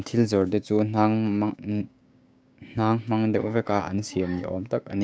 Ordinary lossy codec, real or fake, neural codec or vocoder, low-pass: none; fake; codec, 16 kHz, 6 kbps, DAC; none